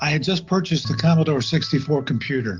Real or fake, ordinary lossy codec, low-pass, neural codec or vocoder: real; Opus, 24 kbps; 7.2 kHz; none